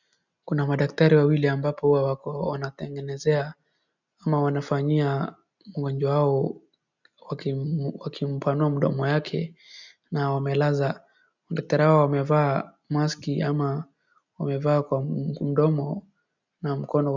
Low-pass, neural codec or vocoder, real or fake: 7.2 kHz; none; real